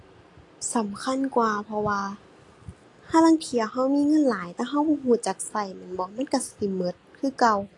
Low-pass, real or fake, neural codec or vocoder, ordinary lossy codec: 10.8 kHz; real; none; AAC, 48 kbps